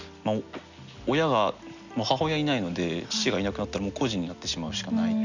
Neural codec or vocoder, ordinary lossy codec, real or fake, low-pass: none; none; real; 7.2 kHz